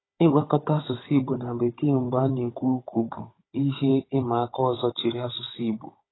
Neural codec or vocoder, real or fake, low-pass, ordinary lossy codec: codec, 16 kHz, 16 kbps, FunCodec, trained on Chinese and English, 50 frames a second; fake; 7.2 kHz; AAC, 16 kbps